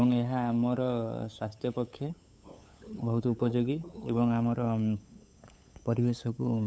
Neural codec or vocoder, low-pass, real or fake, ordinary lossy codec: codec, 16 kHz, 8 kbps, FunCodec, trained on LibriTTS, 25 frames a second; none; fake; none